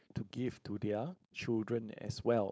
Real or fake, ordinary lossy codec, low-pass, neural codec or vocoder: fake; none; none; codec, 16 kHz, 4.8 kbps, FACodec